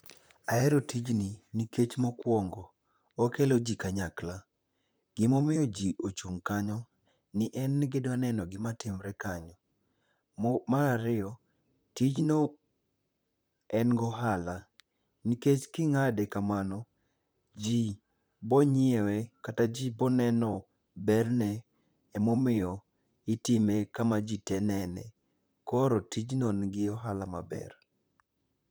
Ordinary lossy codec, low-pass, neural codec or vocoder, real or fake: none; none; vocoder, 44.1 kHz, 128 mel bands, Pupu-Vocoder; fake